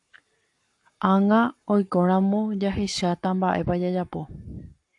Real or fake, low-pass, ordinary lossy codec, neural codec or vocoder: fake; 10.8 kHz; AAC, 64 kbps; codec, 44.1 kHz, 7.8 kbps, Pupu-Codec